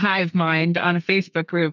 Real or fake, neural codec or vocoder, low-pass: fake; codec, 32 kHz, 1.9 kbps, SNAC; 7.2 kHz